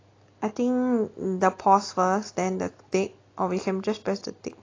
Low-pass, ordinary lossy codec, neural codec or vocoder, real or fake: 7.2 kHz; AAC, 32 kbps; none; real